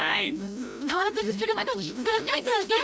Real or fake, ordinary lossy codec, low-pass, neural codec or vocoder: fake; none; none; codec, 16 kHz, 0.5 kbps, FreqCodec, larger model